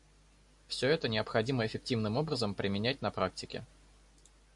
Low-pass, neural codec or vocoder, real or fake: 10.8 kHz; none; real